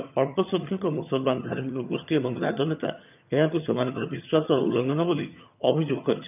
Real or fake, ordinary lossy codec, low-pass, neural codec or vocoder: fake; none; 3.6 kHz; vocoder, 22.05 kHz, 80 mel bands, HiFi-GAN